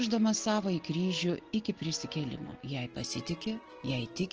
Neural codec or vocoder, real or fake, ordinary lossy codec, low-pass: none; real; Opus, 16 kbps; 7.2 kHz